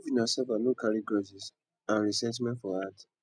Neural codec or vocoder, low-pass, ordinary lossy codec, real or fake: none; 9.9 kHz; none; real